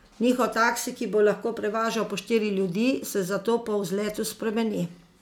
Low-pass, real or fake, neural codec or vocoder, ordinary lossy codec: 19.8 kHz; real; none; none